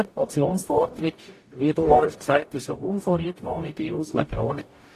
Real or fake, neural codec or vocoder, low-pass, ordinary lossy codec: fake; codec, 44.1 kHz, 0.9 kbps, DAC; 14.4 kHz; AAC, 48 kbps